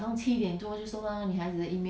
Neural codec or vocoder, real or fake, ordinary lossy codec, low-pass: none; real; none; none